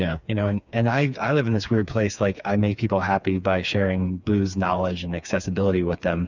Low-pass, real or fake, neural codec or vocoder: 7.2 kHz; fake; codec, 16 kHz, 4 kbps, FreqCodec, smaller model